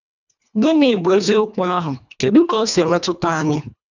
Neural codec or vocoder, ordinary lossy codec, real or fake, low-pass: codec, 24 kHz, 1.5 kbps, HILCodec; none; fake; 7.2 kHz